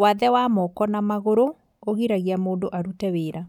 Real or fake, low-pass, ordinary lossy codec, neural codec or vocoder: real; 19.8 kHz; none; none